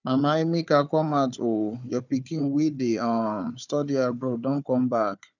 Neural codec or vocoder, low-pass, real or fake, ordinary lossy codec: codec, 16 kHz, 4 kbps, FunCodec, trained on Chinese and English, 50 frames a second; 7.2 kHz; fake; none